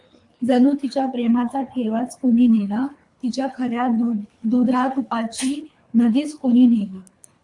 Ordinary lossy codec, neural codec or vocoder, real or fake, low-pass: AAC, 64 kbps; codec, 24 kHz, 3 kbps, HILCodec; fake; 10.8 kHz